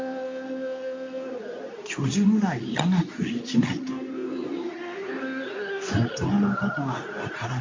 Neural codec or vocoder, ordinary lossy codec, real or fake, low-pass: codec, 24 kHz, 0.9 kbps, WavTokenizer, medium speech release version 2; MP3, 48 kbps; fake; 7.2 kHz